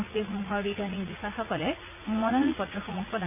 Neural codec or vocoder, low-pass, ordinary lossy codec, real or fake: vocoder, 44.1 kHz, 80 mel bands, Vocos; 3.6 kHz; none; fake